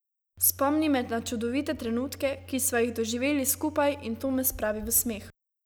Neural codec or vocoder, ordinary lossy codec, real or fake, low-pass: none; none; real; none